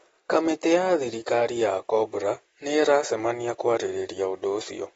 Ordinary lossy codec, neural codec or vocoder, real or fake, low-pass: AAC, 24 kbps; none; real; 19.8 kHz